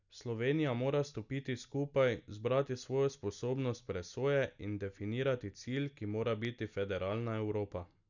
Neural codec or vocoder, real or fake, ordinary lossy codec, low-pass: none; real; none; 7.2 kHz